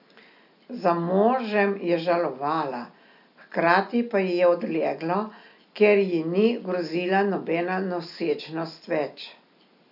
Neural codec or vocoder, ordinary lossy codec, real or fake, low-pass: none; none; real; 5.4 kHz